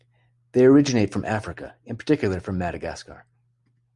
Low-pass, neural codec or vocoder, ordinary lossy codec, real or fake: 10.8 kHz; none; Opus, 64 kbps; real